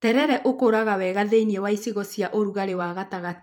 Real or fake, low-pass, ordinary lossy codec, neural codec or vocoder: fake; 19.8 kHz; MP3, 96 kbps; vocoder, 44.1 kHz, 128 mel bands every 512 samples, BigVGAN v2